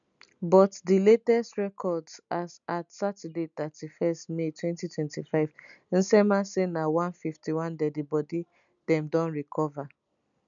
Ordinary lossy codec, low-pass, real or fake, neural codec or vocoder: none; 7.2 kHz; real; none